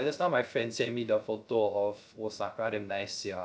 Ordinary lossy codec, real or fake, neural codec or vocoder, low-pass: none; fake; codec, 16 kHz, 0.3 kbps, FocalCodec; none